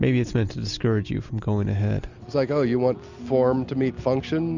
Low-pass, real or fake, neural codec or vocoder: 7.2 kHz; real; none